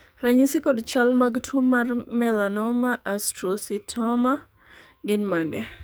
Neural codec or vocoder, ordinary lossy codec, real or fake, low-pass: codec, 44.1 kHz, 2.6 kbps, SNAC; none; fake; none